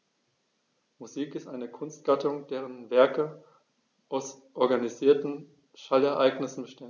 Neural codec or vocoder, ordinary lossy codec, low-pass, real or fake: none; none; 7.2 kHz; real